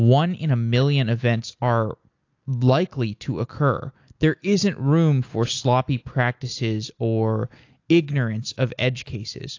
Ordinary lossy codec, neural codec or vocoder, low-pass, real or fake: AAC, 48 kbps; none; 7.2 kHz; real